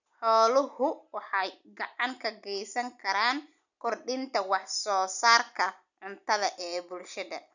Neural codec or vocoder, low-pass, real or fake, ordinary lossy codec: none; 7.2 kHz; real; none